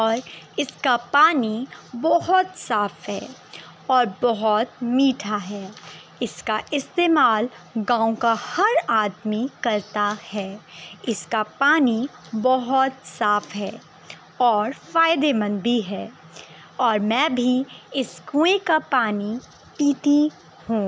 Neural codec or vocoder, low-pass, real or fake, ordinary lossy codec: none; none; real; none